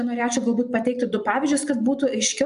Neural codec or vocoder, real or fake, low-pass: none; real; 10.8 kHz